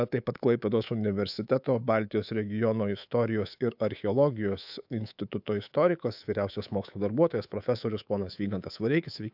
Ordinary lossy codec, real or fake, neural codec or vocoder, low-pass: AAC, 48 kbps; fake; codec, 24 kHz, 3.1 kbps, DualCodec; 5.4 kHz